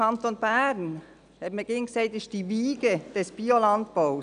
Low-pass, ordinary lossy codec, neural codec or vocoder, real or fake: 9.9 kHz; none; none; real